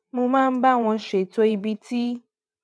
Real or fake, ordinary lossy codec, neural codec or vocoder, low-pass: fake; none; vocoder, 22.05 kHz, 80 mel bands, WaveNeXt; none